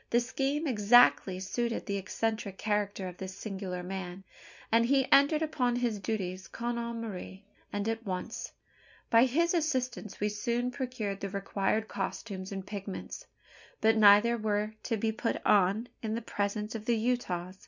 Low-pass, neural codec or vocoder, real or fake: 7.2 kHz; none; real